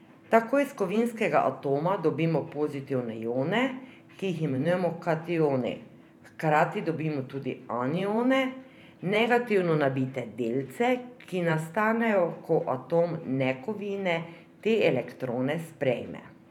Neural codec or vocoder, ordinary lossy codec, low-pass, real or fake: vocoder, 44.1 kHz, 128 mel bands every 512 samples, BigVGAN v2; none; 19.8 kHz; fake